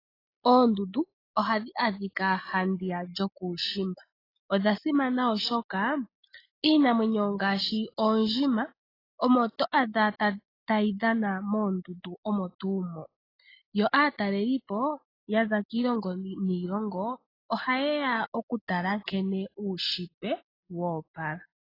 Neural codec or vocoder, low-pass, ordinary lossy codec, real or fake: none; 5.4 kHz; AAC, 24 kbps; real